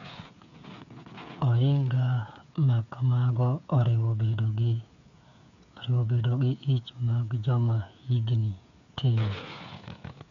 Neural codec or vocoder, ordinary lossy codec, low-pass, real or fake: codec, 16 kHz, 8 kbps, FreqCodec, smaller model; none; 7.2 kHz; fake